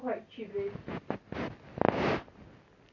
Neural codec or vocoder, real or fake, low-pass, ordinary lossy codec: none; real; 7.2 kHz; none